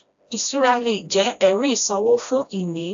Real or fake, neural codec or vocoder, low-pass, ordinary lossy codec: fake; codec, 16 kHz, 1 kbps, FreqCodec, smaller model; 7.2 kHz; AAC, 64 kbps